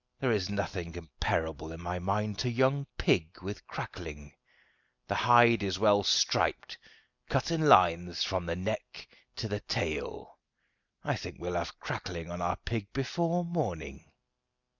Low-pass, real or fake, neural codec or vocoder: 7.2 kHz; real; none